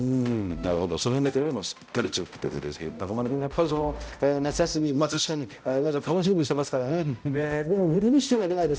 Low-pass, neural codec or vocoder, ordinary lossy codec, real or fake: none; codec, 16 kHz, 0.5 kbps, X-Codec, HuBERT features, trained on balanced general audio; none; fake